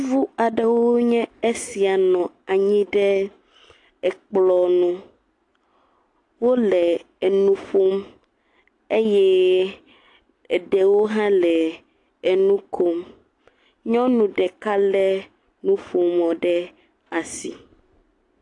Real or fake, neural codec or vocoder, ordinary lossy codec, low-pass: real; none; AAC, 48 kbps; 10.8 kHz